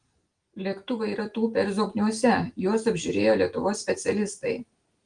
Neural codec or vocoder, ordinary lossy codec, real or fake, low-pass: none; Opus, 24 kbps; real; 9.9 kHz